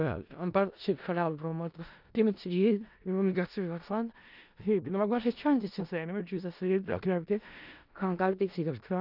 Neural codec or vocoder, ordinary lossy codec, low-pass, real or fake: codec, 16 kHz in and 24 kHz out, 0.4 kbps, LongCat-Audio-Codec, four codebook decoder; none; 5.4 kHz; fake